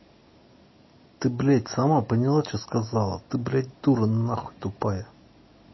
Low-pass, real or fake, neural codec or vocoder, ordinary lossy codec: 7.2 kHz; real; none; MP3, 24 kbps